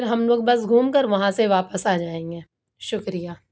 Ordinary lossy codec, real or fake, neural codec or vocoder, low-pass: none; real; none; none